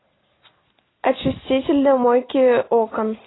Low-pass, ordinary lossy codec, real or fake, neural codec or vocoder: 7.2 kHz; AAC, 16 kbps; real; none